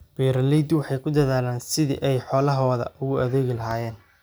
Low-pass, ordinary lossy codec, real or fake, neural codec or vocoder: none; none; real; none